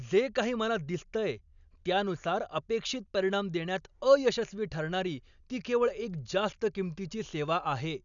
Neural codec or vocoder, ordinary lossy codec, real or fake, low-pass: none; none; real; 7.2 kHz